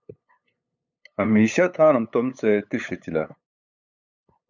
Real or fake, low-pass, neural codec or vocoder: fake; 7.2 kHz; codec, 16 kHz, 8 kbps, FunCodec, trained on LibriTTS, 25 frames a second